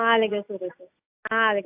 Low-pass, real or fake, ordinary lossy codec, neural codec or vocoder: 3.6 kHz; real; AAC, 32 kbps; none